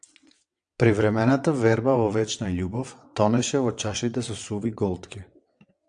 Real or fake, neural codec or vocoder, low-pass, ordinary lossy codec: fake; vocoder, 22.05 kHz, 80 mel bands, WaveNeXt; 9.9 kHz; AAC, 64 kbps